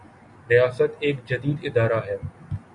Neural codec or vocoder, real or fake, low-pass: none; real; 10.8 kHz